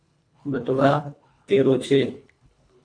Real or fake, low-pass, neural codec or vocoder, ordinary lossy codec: fake; 9.9 kHz; codec, 24 kHz, 1.5 kbps, HILCodec; AAC, 48 kbps